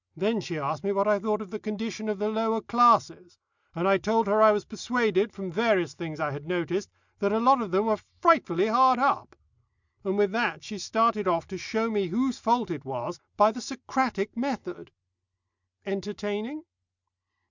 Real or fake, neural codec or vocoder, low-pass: real; none; 7.2 kHz